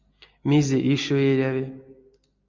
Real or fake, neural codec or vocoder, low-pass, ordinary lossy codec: real; none; 7.2 kHz; MP3, 48 kbps